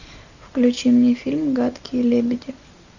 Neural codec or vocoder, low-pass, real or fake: none; 7.2 kHz; real